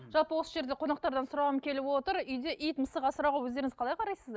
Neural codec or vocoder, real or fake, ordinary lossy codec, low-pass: none; real; none; none